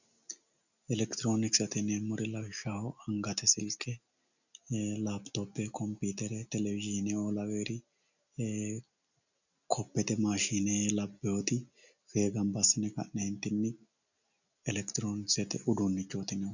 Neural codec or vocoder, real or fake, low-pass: none; real; 7.2 kHz